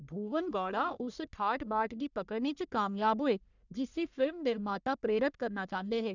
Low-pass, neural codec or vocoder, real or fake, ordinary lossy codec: 7.2 kHz; codec, 44.1 kHz, 1.7 kbps, Pupu-Codec; fake; none